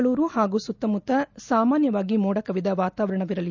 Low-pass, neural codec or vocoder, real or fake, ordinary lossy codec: 7.2 kHz; none; real; none